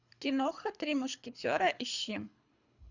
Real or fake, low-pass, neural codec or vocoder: fake; 7.2 kHz; codec, 24 kHz, 3 kbps, HILCodec